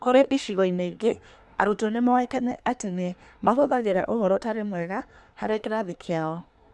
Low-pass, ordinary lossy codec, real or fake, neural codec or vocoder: none; none; fake; codec, 24 kHz, 1 kbps, SNAC